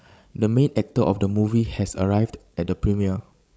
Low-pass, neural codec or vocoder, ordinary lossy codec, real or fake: none; codec, 16 kHz, 16 kbps, FreqCodec, larger model; none; fake